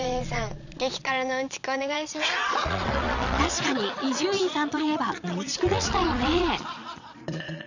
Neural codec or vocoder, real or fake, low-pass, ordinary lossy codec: vocoder, 22.05 kHz, 80 mel bands, Vocos; fake; 7.2 kHz; none